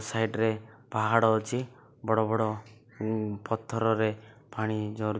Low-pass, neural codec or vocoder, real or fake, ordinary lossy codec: none; none; real; none